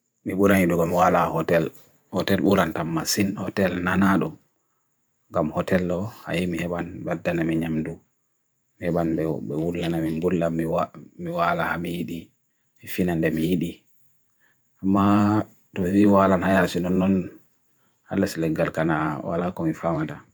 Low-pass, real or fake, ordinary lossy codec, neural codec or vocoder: none; fake; none; vocoder, 44.1 kHz, 128 mel bands every 512 samples, BigVGAN v2